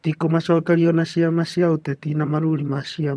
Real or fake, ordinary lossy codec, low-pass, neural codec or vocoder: fake; none; none; vocoder, 22.05 kHz, 80 mel bands, HiFi-GAN